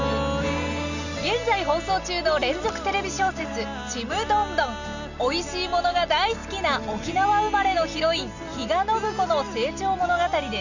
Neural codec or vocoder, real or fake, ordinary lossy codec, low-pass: none; real; none; 7.2 kHz